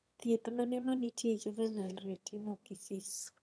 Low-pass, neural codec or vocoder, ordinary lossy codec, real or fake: none; autoencoder, 22.05 kHz, a latent of 192 numbers a frame, VITS, trained on one speaker; none; fake